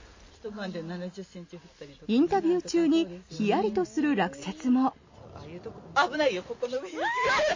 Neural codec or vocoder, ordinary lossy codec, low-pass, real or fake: none; MP3, 32 kbps; 7.2 kHz; real